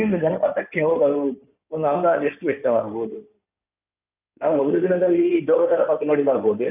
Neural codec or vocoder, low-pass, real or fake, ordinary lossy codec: codec, 16 kHz in and 24 kHz out, 2.2 kbps, FireRedTTS-2 codec; 3.6 kHz; fake; none